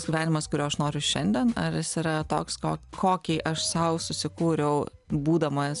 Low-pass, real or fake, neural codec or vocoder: 10.8 kHz; real; none